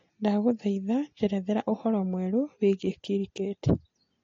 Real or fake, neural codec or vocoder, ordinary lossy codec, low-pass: real; none; AAC, 32 kbps; 7.2 kHz